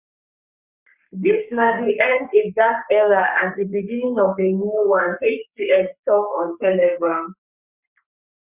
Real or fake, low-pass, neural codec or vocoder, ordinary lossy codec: fake; 3.6 kHz; codec, 44.1 kHz, 3.4 kbps, Pupu-Codec; Opus, 64 kbps